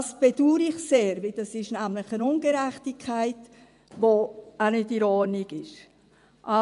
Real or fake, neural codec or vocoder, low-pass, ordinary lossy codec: fake; vocoder, 24 kHz, 100 mel bands, Vocos; 10.8 kHz; none